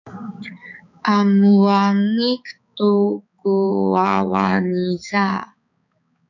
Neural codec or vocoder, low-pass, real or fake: codec, 16 kHz, 4 kbps, X-Codec, HuBERT features, trained on balanced general audio; 7.2 kHz; fake